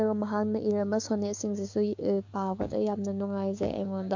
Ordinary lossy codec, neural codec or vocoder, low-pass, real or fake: MP3, 48 kbps; codec, 16 kHz, 6 kbps, DAC; 7.2 kHz; fake